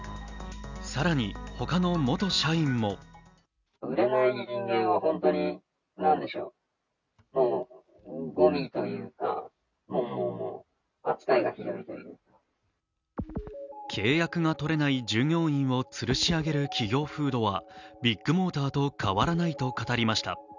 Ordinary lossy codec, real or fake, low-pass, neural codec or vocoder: none; real; 7.2 kHz; none